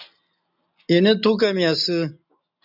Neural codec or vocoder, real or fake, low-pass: none; real; 5.4 kHz